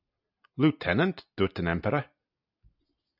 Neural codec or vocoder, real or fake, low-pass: none; real; 5.4 kHz